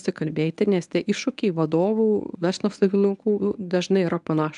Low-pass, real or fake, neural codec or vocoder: 10.8 kHz; fake; codec, 24 kHz, 0.9 kbps, WavTokenizer, medium speech release version 1